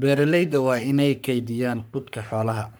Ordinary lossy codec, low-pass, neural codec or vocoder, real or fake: none; none; codec, 44.1 kHz, 3.4 kbps, Pupu-Codec; fake